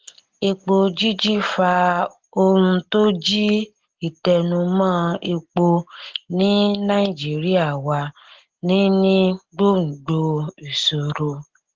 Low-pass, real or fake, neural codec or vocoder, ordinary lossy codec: 7.2 kHz; real; none; Opus, 16 kbps